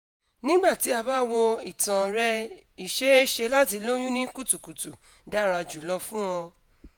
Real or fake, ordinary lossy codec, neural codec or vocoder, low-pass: fake; none; vocoder, 48 kHz, 128 mel bands, Vocos; none